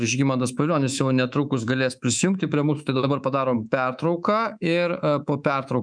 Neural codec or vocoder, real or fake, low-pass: codec, 24 kHz, 3.1 kbps, DualCodec; fake; 9.9 kHz